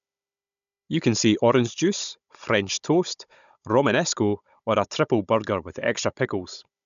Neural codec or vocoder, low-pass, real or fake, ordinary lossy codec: codec, 16 kHz, 16 kbps, FunCodec, trained on Chinese and English, 50 frames a second; 7.2 kHz; fake; none